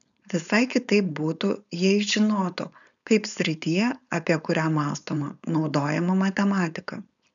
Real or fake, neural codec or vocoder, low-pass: fake; codec, 16 kHz, 4.8 kbps, FACodec; 7.2 kHz